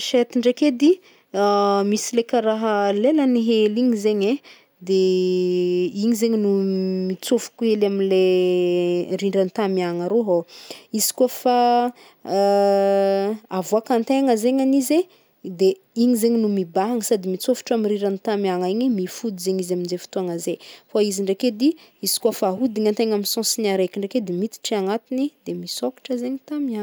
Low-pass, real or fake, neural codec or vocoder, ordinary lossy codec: none; real; none; none